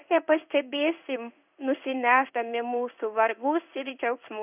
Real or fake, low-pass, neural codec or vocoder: fake; 3.6 kHz; codec, 16 kHz in and 24 kHz out, 1 kbps, XY-Tokenizer